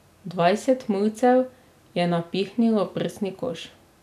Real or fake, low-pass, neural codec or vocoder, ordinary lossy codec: real; 14.4 kHz; none; none